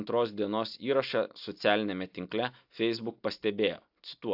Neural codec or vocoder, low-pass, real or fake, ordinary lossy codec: none; 5.4 kHz; real; AAC, 48 kbps